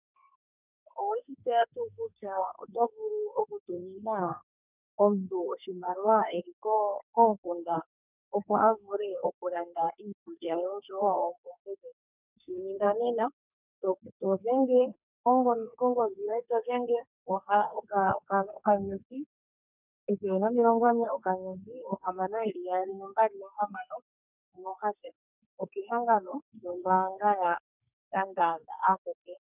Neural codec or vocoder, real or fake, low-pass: codec, 32 kHz, 1.9 kbps, SNAC; fake; 3.6 kHz